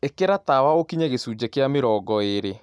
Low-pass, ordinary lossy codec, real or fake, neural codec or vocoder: none; none; real; none